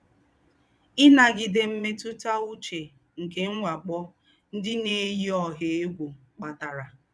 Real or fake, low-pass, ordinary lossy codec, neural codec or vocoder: fake; none; none; vocoder, 22.05 kHz, 80 mel bands, Vocos